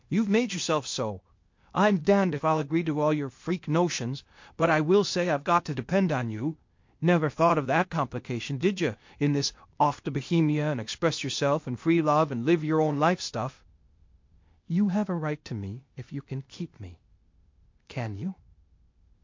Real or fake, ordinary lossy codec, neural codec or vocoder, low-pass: fake; MP3, 48 kbps; codec, 16 kHz, 0.8 kbps, ZipCodec; 7.2 kHz